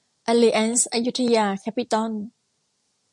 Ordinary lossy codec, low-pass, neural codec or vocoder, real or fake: MP3, 64 kbps; 10.8 kHz; none; real